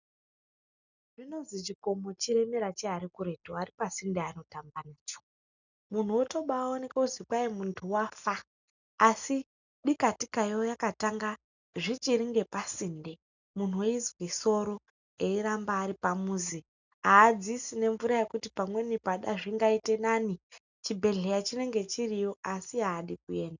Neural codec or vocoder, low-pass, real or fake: none; 7.2 kHz; real